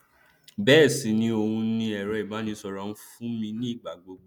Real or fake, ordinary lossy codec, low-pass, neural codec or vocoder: real; none; 19.8 kHz; none